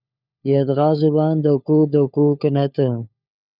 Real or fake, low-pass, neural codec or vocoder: fake; 5.4 kHz; codec, 16 kHz, 4 kbps, FunCodec, trained on LibriTTS, 50 frames a second